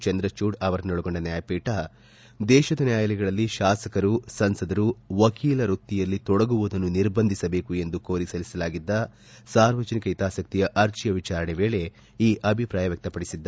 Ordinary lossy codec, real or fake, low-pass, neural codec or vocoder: none; real; none; none